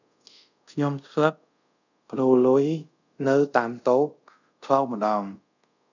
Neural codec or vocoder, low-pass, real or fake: codec, 24 kHz, 0.5 kbps, DualCodec; 7.2 kHz; fake